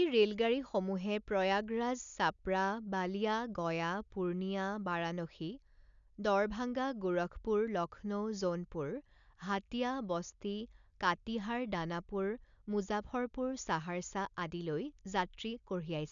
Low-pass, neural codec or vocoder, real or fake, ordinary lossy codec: 7.2 kHz; none; real; none